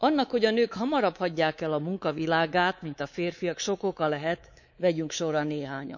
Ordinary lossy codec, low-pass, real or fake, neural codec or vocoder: none; 7.2 kHz; fake; codec, 24 kHz, 3.1 kbps, DualCodec